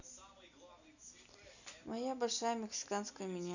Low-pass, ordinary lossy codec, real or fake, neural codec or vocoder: 7.2 kHz; none; real; none